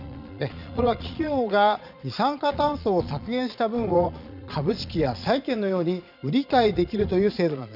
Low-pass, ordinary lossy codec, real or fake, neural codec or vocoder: 5.4 kHz; none; fake; vocoder, 22.05 kHz, 80 mel bands, Vocos